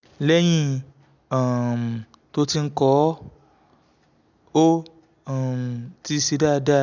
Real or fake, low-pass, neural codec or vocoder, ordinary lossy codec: real; 7.2 kHz; none; none